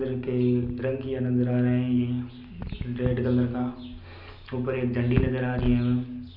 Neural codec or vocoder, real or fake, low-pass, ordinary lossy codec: none; real; 5.4 kHz; none